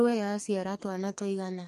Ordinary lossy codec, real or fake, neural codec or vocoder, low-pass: MP3, 64 kbps; fake; codec, 44.1 kHz, 2.6 kbps, SNAC; 14.4 kHz